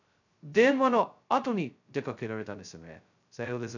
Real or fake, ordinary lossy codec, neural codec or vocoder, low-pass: fake; none; codec, 16 kHz, 0.2 kbps, FocalCodec; 7.2 kHz